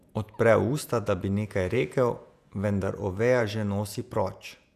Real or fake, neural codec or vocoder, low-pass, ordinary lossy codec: fake; vocoder, 48 kHz, 128 mel bands, Vocos; 14.4 kHz; none